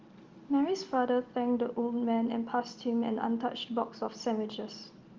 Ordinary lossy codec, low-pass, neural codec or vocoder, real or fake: Opus, 32 kbps; 7.2 kHz; none; real